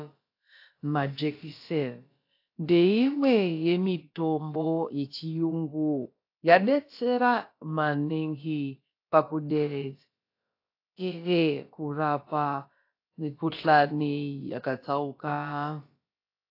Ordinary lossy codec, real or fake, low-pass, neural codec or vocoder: AAC, 32 kbps; fake; 5.4 kHz; codec, 16 kHz, about 1 kbps, DyCAST, with the encoder's durations